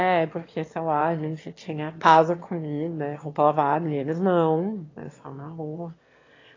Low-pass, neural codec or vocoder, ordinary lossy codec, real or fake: 7.2 kHz; autoencoder, 22.05 kHz, a latent of 192 numbers a frame, VITS, trained on one speaker; AAC, 32 kbps; fake